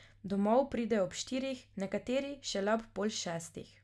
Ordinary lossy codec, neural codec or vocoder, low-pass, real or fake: none; none; none; real